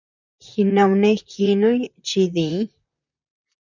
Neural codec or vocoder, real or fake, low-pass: vocoder, 44.1 kHz, 128 mel bands, Pupu-Vocoder; fake; 7.2 kHz